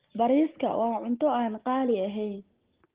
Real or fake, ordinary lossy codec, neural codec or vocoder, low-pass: real; Opus, 16 kbps; none; 3.6 kHz